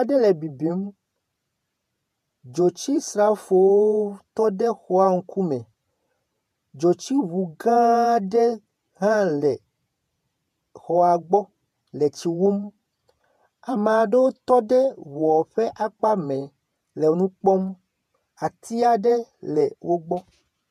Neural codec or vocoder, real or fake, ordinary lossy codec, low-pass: vocoder, 48 kHz, 128 mel bands, Vocos; fake; MP3, 96 kbps; 14.4 kHz